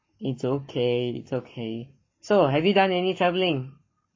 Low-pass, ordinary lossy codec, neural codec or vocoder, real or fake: 7.2 kHz; MP3, 32 kbps; codec, 44.1 kHz, 7.8 kbps, Pupu-Codec; fake